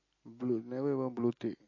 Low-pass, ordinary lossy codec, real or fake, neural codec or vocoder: 7.2 kHz; MP3, 32 kbps; real; none